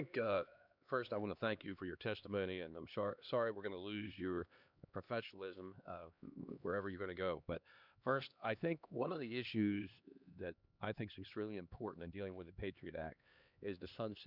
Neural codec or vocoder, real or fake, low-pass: codec, 16 kHz, 2 kbps, X-Codec, HuBERT features, trained on LibriSpeech; fake; 5.4 kHz